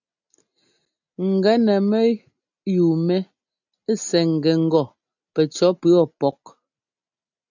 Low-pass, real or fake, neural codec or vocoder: 7.2 kHz; real; none